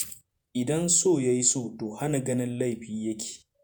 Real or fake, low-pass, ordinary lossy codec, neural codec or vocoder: fake; none; none; vocoder, 48 kHz, 128 mel bands, Vocos